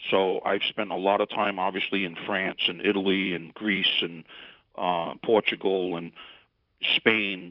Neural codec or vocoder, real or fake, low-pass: vocoder, 44.1 kHz, 128 mel bands, Pupu-Vocoder; fake; 5.4 kHz